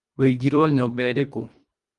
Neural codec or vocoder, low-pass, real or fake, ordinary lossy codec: codec, 24 kHz, 1.5 kbps, HILCodec; 10.8 kHz; fake; Opus, 32 kbps